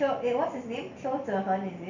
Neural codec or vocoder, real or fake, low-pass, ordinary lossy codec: none; real; 7.2 kHz; none